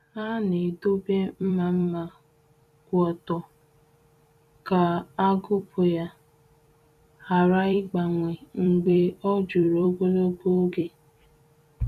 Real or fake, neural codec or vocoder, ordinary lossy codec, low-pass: fake; vocoder, 44.1 kHz, 128 mel bands every 256 samples, BigVGAN v2; none; 14.4 kHz